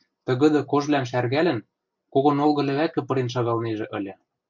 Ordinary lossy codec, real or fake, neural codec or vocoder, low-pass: MP3, 64 kbps; real; none; 7.2 kHz